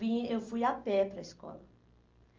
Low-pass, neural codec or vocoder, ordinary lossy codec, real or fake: 7.2 kHz; none; Opus, 32 kbps; real